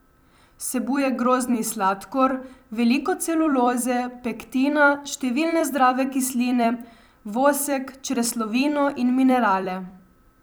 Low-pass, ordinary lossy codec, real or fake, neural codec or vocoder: none; none; fake; vocoder, 44.1 kHz, 128 mel bands every 256 samples, BigVGAN v2